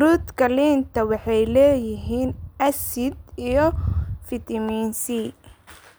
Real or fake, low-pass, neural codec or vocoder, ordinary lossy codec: real; none; none; none